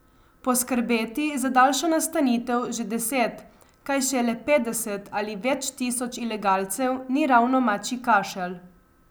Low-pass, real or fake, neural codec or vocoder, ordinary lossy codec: none; real; none; none